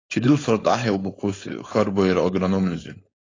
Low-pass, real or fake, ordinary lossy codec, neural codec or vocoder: 7.2 kHz; fake; AAC, 32 kbps; codec, 16 kHz, 4.8 kbps, FACodec